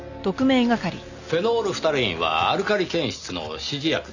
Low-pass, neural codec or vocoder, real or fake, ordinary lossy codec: 7.2 kHz; none; real; none